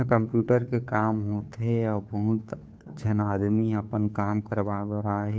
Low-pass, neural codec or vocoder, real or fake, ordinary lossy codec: none; codec, 16 kHz, 2 kbps, FunCodec, trained on Chinese and English, 25 frames a second; fake; none